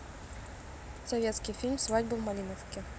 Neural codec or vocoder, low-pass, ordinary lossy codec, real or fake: none; none; none; real